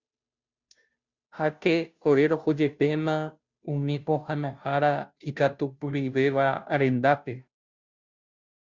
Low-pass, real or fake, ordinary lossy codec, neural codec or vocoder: 7.2 kHz; fake; Opus, 64 kbps; codec, 16 kHz, 0.5 kbps, FunCodec, trained on Chinese and English, 25 frames a second